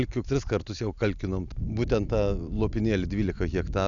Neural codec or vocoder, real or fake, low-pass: none; real; 7.2 kHz